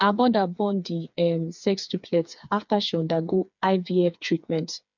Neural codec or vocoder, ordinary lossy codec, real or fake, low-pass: codec, 16 kHz, 4 kbps, FreqCodec, smaller model; none; fake; 7.2 kHz